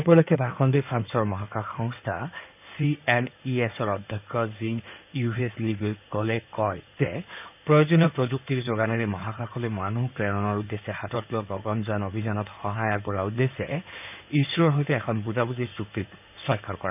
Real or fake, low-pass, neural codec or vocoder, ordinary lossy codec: fake; 3.6 kHz; codec, 16 kHz in and 24 kHz out, 2.2 kbps, FireRedTTS-2 codec; none